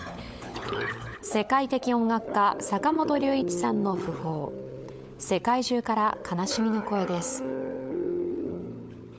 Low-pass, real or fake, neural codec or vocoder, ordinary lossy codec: none; fake; codec, 16 kHz, 16 kbps, FunCodec, trained on LibriTTS, 50 frames a second; none